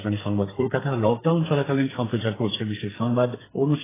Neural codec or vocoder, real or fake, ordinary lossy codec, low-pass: codec, 44.1 kHz, 2.6 kbps, SNAC; fake; AAC, 16 kbps; 3.6 kHz